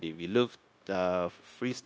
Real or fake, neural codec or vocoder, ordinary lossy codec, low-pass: fake; codec, 16 kHz, 0.9 kbps, LongCat-Audio-Codec; none; none